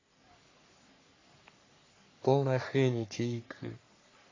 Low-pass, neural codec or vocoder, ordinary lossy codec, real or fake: 7.2 kHz; codec, 44.1 kHz, 3.4 kbps, Pupu-Codec; AAC, 32 kbps; fake